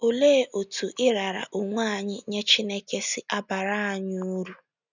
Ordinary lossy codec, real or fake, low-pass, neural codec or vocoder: none; real; 7.2 kHz; none